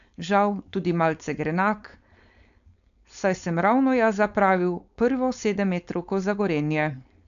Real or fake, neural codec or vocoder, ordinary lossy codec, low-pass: fake; codec, 16 kHz, 4.8 kbps, FACodec; MP3, 96 kbps; 7.2 kHz